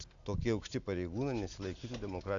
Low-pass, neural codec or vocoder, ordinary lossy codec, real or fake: 7.2 kHz; none; MP3, 64 kbps; real